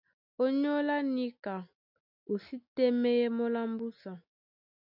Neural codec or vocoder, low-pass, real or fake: none; 5.4 kHz; real